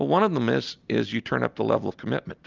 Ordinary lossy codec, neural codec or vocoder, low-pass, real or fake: Opus, 32 kbps; none; 7.2 kHz; real